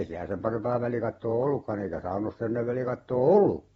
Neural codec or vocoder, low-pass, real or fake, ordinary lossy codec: vocoder, 44.1 kHz, 128 mel bands every 512 samples, BigVGAN v2; 19.8 kHz; fake; AAC, 24 kbps